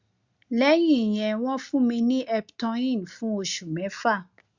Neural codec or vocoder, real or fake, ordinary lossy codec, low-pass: none; real; Opus, 64 kbps; 7.2 kHz